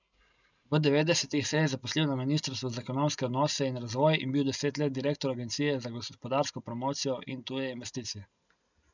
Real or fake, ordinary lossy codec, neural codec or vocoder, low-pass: real; none; none; 7.2 kHz